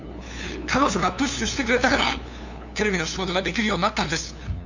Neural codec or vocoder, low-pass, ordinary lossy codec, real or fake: codec, 16 kHz, 2 kbps, FunCodec, trained on LibriTTS, 25 frames a second; 7.2 kHz; none; fake